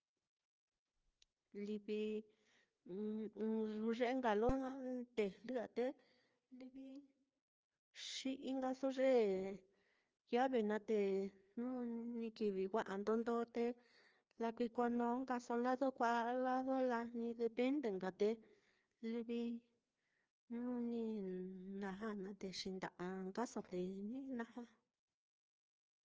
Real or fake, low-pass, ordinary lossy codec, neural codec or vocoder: fake; 7.2 kHz; Opus, 24 kbps; codec, 16 kHz, 2 kbps, FreqCodec, larger model